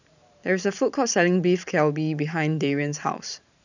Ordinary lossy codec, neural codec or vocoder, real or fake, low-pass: none; vocoder, 44.1 kHz, 128 mel bands every 512 samples, BigVGAN v2; fake; 7.2 kHz